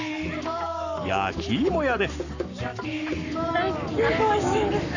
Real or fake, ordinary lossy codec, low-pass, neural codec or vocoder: fake; none; 7.2 kHz; codec, 44.1 kHz, 7.8 kbps, Pupu-Codec